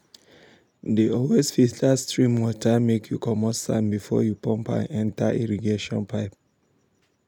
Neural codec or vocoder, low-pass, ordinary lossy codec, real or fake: none; 19.8 kHz; none; real